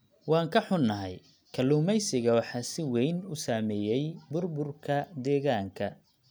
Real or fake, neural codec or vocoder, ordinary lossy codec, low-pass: real; none; none; none